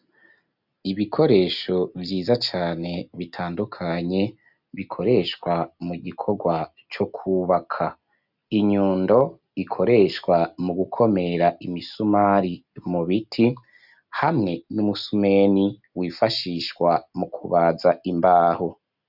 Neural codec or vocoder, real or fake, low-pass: none; real; 5.4 kHz